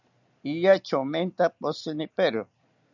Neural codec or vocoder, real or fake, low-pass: none; real; 7.2 kHz